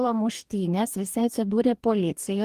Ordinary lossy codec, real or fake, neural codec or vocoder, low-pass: Opus, 24 kbps; fake; codec, 44.1 kHz, 2.6 kbps, DAC; 14.4 kHz